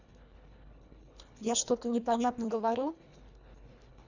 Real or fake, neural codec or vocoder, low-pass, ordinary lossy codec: fake; codec, 24 kHz, 1.5 kbps, HILCodec; 7.2 kHz; none